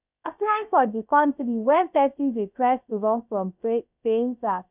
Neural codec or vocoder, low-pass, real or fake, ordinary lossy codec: codec, 16 kHz, 0.3 kbps, FocalCodec; 3.6 kHz; fake; none